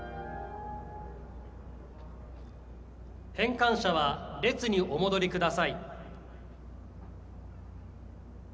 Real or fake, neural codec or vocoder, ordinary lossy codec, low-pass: real; none; none; none